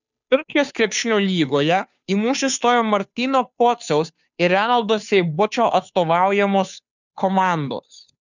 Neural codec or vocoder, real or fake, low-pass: codec, 16 kHz, 2 kbps, FunCodec, trained on Chinese and English, 25 frames a second; fake; 7.2 kHz